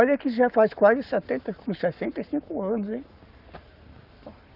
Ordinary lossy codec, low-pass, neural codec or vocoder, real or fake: Opus, 64 kbps; 5.4 kHz; codec, 44.1 kHz, 7.8 kbps, Pupu-Codec; fake